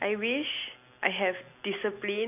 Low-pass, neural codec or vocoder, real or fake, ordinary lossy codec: 3.6 kHz; none; real; none